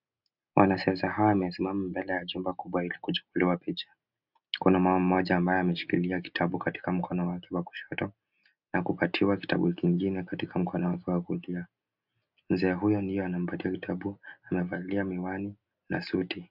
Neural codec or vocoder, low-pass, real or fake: none; 5.4 kHz; real